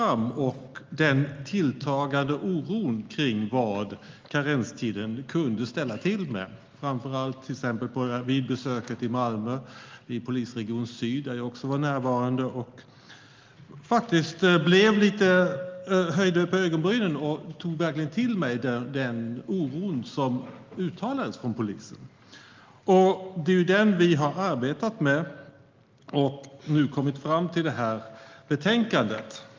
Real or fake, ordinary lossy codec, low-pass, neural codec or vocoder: real; Opus, 32 kbps; 7.2 kHz; none